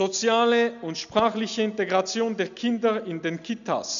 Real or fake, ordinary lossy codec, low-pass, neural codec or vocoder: real; none; 7.2 kHz; none